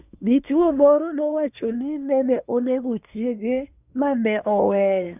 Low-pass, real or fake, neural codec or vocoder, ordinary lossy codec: 3.6 kHz; fake; codec, 24 kHz, 1 kbps, SNAC; none